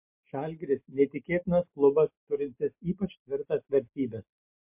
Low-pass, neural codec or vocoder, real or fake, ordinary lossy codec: 3.6 kHz; none; real; MP3, 32 kbps